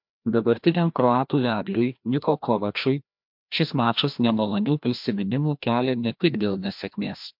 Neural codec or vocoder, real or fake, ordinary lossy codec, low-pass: codec, 16 kHz, 1 kbps, FreqCodec, larger model; fake; MP3, 48 kbps; 5.4 kHz